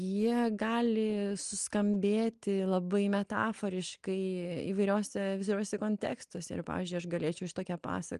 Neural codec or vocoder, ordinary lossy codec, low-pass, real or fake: none; Opus, 32 kbps; 10.8 kHz; real